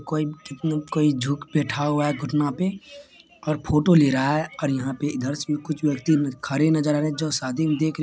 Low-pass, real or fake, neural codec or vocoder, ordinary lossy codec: none; real; none; none